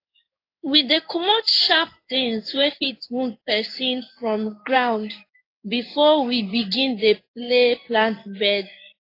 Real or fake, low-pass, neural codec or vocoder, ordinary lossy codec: fake; 5.4 kHz; codec, 16 kHz in and 24 kHz out, 1 kbps, XY-Tokenizer; AAC, 32 kbps